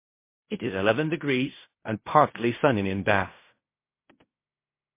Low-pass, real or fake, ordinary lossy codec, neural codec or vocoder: 3.6 kHz; fake; MP3, 24 kbps; codec, 16 kHz in and 24 kHz out, 0.4 kbps, LongCat-Audio-Codec, fine tuned four codebook decoder